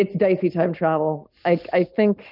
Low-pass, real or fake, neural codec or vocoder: 5.4 kHz; real; none